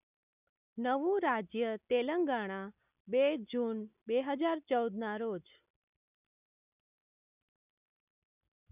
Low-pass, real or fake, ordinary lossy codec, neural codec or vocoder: 3.6 kHz; real; none; none